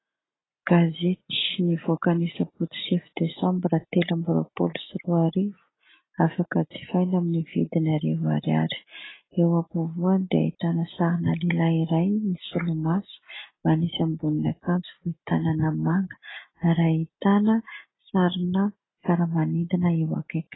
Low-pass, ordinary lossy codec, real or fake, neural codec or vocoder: 7.2 kHz; AAC, 16 kbps; real; none